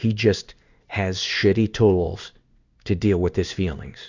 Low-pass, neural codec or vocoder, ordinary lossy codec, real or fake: 7.2 kHz; codec, 16 kHz in and 24 kHz out, 1 kbps, XY-Tokenizer; Opus, 64 kbps; fake